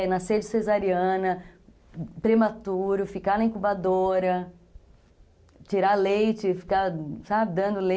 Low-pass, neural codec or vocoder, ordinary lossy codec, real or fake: none; none; none; real